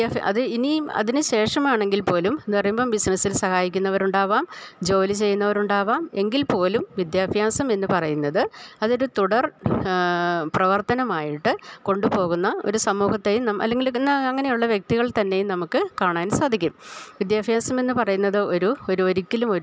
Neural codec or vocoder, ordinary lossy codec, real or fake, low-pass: none; none; real; none